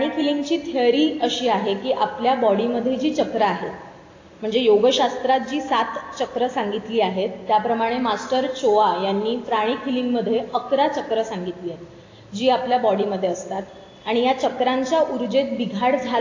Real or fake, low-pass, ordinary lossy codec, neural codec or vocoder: real; 7.2 kHz; AAC, 32 kbps; none